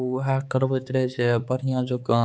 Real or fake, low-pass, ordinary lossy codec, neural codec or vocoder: fake; none; none; codec, 16 kHz, 4 kbps, X-Codec, HuBERT features, trained on balanced general audio